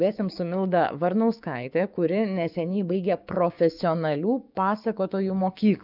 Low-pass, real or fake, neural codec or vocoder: 5.4 kHz; fake; codec, 16 kHz, 6 kbps, DAC